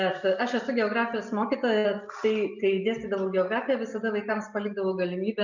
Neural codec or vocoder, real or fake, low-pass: none; real; 7.2 kHz